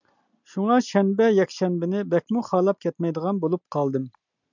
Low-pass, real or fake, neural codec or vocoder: 7.2 kHz; real; none